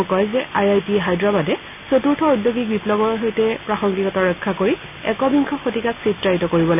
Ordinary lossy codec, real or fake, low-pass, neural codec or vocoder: none; real; 3.6 kHz; none